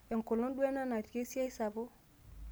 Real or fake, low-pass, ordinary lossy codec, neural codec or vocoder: real; none; none; none